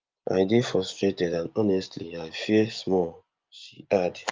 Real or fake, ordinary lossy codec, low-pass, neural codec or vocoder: fake; Opus, 24 kbps; 7.2 kHz; vocoder, 24 kHz, 100 mel bands, Vocos